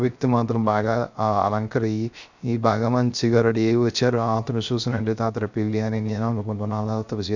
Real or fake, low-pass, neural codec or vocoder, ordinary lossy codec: fake; 7.2 kHz; codec, 16 kHz, 0.3 kbps, FocalCodec; none